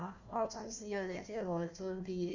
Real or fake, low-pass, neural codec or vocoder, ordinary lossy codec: fake; 7.2 kHz; codec, 16 kHz, 1 kbps, FunCodec, trained on Chinese and English, 50 frames a second; none